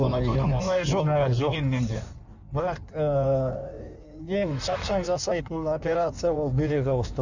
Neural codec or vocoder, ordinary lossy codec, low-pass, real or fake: codec, 16 kHz in and 24 kHz out, 1.1 kbps, FireRedTTS-2 codec; none; 7.2 kHz; fake